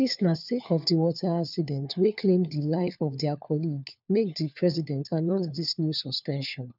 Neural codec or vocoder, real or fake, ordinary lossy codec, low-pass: codec, 16 kHz, 4 kbps, FunCodec, trained on LibriTTS, 50 frames a second; fake; none; 5.4 kHz